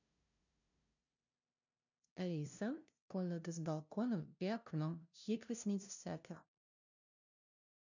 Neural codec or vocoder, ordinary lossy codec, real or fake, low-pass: codec, 16 kHz, 0.5 kbps, FunCodec, trained on LibriTTS, 25 frames a second; none; fake; 7.2 kHz